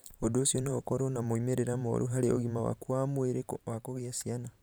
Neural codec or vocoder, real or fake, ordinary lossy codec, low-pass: vocoder, 44.1 kHz, 128 mel bands every 512 samples, BigVGAN v2; fake; none; none